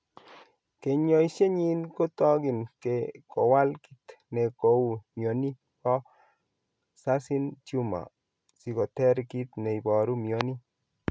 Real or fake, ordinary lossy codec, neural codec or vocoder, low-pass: real; none; none; none